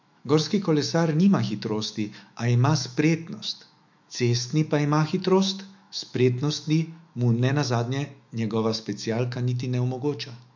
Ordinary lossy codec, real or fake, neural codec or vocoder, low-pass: MP3, 64 kbps; fake; autoencoder, 48 kHz, 128 numbers a frame, DAC-VAE, trained on Japanese speech; 7.2 kHz